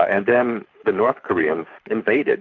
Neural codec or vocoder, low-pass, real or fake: codec, 24 kHz, 6 kbps, HILCodec; 7.2 kHz; fake